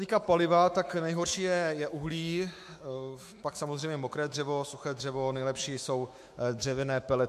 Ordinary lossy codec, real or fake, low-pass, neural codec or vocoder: MP3, 64 kbps; fake; 14.4 kHz; autoencoder, 48 kHz, 128 numbers a frame, DAC-VAE, trained on Japanese speech